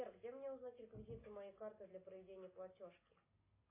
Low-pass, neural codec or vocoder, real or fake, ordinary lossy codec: 3.6 kHz; none; real; AAC, 24 kbps